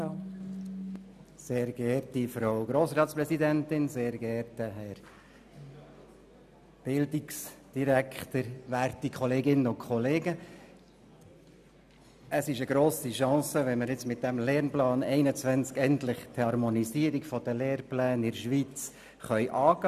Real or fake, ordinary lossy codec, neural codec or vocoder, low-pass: real; none; none; 14.4 kHz